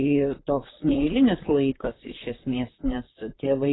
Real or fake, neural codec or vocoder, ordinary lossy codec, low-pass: fake; vocoder, 44.1 kHz, 128 mel bands, Pupu-Vocoder; AAC, 16 kbps; 7.2 kHz